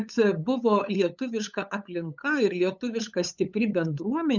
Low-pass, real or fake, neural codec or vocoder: 7.2 kHz; fake; codec, 16 kHz, 8 kbps, FunCodec, trained on LibriTTS, 25 frames a second